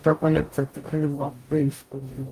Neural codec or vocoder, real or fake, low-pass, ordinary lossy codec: codec, 44.1 kHz, 0.9 kbps, DAC; fake; 14.4 kHz; Opus, 32 kbps